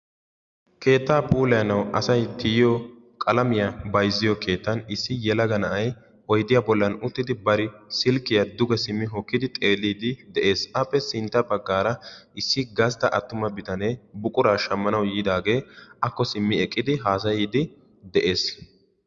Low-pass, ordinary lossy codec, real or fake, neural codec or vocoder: 7.2 kHz; Opus, 64 kbps; real; none